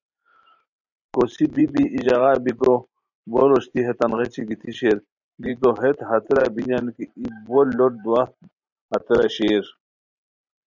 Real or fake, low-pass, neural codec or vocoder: fake; 7.2 kHz; vocoder, 44.1 kHz, 128 mel bands every 512 samples, BigVGAN v2